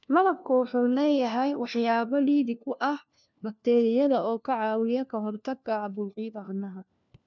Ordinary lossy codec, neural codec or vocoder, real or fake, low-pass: none; codec, 16 kHz, 1 kbps, FunCodec, trained on LibriTTS, 50 frames a second; fake; 7.2 kHz